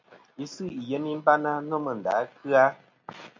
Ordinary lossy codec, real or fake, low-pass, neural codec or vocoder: MP3, 48 kbps; real; 7.2 kHz; none